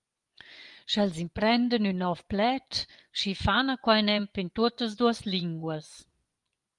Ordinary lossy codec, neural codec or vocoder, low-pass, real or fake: Opus, 32 kbps; vocoder, 24 kHz, 100 mel bands, Vocos; 10.8 kHz; fake